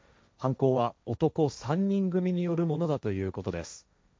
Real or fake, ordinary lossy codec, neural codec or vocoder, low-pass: fake; none; codec, 16 kHz, 1.1 kbps, Voila-Tokenizer; none